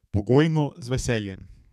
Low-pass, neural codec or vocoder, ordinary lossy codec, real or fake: 14.4 kHz; codec, 32 kHz, 1.9 kbps, SNAC; none; fake